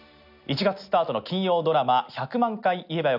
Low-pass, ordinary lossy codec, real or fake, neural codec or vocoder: 5.4 kHz; none; real; none